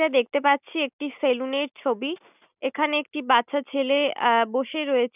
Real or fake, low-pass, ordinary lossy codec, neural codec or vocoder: real; 3.6 kHz; none; none